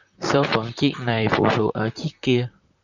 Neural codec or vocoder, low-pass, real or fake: vocoder, 44.1 kHz, 128 mel bands, Pupu-Vocoder; 7.2 kHz; fake